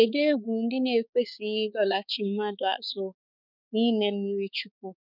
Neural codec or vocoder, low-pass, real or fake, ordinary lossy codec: codec, 16 kHz, 4 kbps, X-Codec, HuBERT features, trained on balanced general audio; 5.4 kHz; fake; none